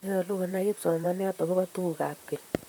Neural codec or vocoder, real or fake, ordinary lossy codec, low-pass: vocoder, 44.1 kHz, 128 mel bands, Pupu-Vocoder; fake; none; none